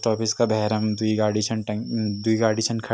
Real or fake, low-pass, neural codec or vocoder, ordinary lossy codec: real; none; none; none